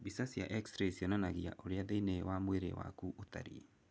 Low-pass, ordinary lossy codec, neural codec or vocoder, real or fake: none; none; none; real